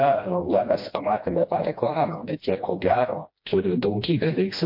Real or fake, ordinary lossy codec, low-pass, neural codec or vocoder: fake; MP3, 32 kbps; 5.4 kHz; codec, 16 kHz, 1 kbps, FreqCodec, smaller model